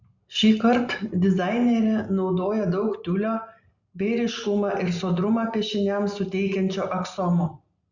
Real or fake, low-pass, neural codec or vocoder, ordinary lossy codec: real; 7.2 kHz; none; Opus, 64 kbps